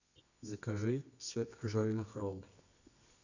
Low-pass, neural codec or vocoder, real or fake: 7.2 kHz; codec, 24 kHz, 0.9 kbps, WavTokenizer, medium music audio release; fake